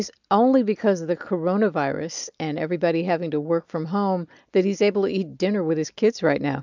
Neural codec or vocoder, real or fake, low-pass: none; real; 7.2 kHz